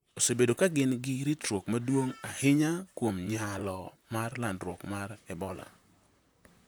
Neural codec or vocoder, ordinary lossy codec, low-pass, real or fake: vocoder, 44.1 kHz, 128 mel bands, Pupu-Vocoder; none; none; fake